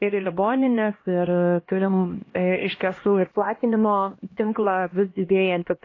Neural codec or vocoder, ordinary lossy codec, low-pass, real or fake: codec, 16 kHz, 1 kbps, X-Codec, HuBERT features, trained on LibriSpeech; AAC, 32 kbps; 7.2 kHz; fake